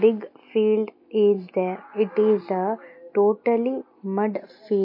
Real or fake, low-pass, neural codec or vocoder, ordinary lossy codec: real; 5.4 kHz; none; MP3, 32 kbps